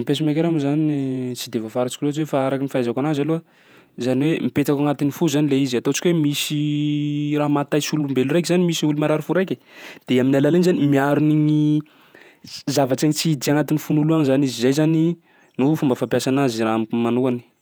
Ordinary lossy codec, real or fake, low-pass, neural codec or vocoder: none; fake; none; vocoder, 48 kHz, 128 mel bands, Vocos